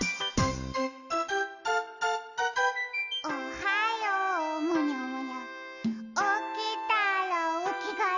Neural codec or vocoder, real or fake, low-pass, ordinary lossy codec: none; real; 7.2 kHz; none